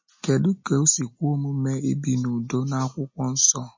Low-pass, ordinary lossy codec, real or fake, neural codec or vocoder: 7.2 kHz; MP3, 32 kbps; real; none